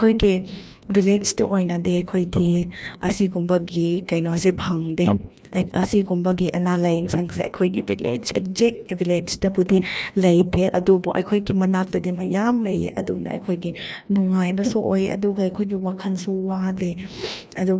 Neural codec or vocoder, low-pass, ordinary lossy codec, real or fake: codec, 16 kHz, 1 kbps, FreqCodec, larger model; none; none; fake